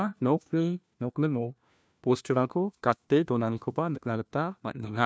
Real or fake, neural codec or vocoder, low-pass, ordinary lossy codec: fake; codec, 16 kHz, 1 kbps, FunCodec, trained on LibriTTS, 50 frames a second; none; none